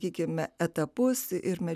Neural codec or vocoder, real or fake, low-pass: none; real; 14.4 kHz